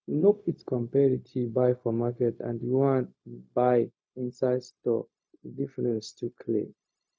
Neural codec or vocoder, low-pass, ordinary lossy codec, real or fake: codec, 16 kHz, 0.4 kbps, LongCat-Audio-Codec; none; none; fake